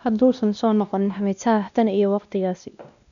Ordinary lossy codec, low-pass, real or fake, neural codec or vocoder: none; 7.2 kHz; fake; codec, 16 kHz, 1 kbps, X-Codec, WavLM features, trained on Multilingual LibriSpeech